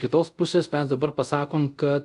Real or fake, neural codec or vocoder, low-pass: fake; codec, 24 kHz, 0.5 kbps, DualCodec; 10.8 kHz